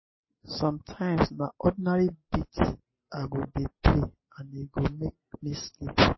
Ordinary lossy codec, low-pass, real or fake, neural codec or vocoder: MP3, 24 kbps; 7.2 kHz; real; none